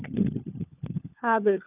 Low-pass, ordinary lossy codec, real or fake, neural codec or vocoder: 3.6 kHz; none; fake; codec, 16 kHz, 4 kbps, FunCodec, trained on LibriTTS, 50 frames a second